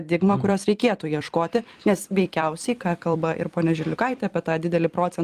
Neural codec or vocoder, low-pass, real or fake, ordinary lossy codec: vocoder, 44.1 kHz, 128 mel bands every 256 samples, BigVGAN v2; 14.4 kHz; fake; Opus, 32 kbps